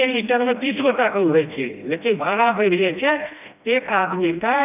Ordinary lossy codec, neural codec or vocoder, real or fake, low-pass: none; codec, 16 kHz, 1 kbps, FreqCodec, smaller model; fake; 3.6 kHz